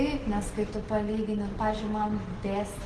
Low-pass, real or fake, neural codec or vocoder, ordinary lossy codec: 10.8 kHz; real; none; Opus, 16 kbps